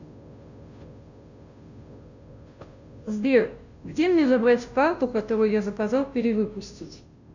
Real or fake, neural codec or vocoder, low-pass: fake; codec, 16 kHz, 0.5 kbps, FunCodec, trained on Chinese and English, 25 frames a second; 7.2 kHz